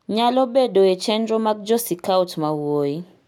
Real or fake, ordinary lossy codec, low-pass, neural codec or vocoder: real; none; 19.8 kHz; none